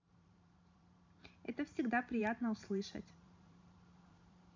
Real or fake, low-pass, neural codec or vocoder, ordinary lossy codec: fake; 7.2 kHz; vocoder, 44.1 kHz, 128 mel bands every 512 samples, BigVGAN v2; MP3, 64 kbps